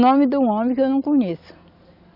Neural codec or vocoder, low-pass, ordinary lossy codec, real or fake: none; 5.4 kHz; none; real